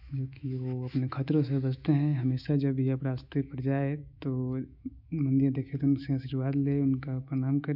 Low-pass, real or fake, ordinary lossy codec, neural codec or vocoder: 5.4 kHz; fake; none; autoencoder, 48 kHz, 128 numbers a frame, DAC-VAE, trained on Japanese speech